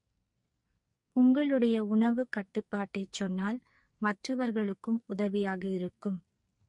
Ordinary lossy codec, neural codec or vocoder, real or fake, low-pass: MP3, 48 kbps; codec, 44.1 kHz, 2.6 kbps, SNAC; fake; 10.8 kHz